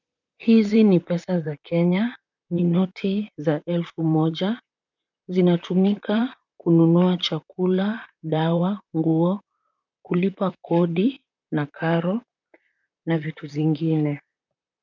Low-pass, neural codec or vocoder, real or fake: 7.2 kHz; vocoder, 44.1 kHz, 128 mel bands, Pupu-Vocoder; fake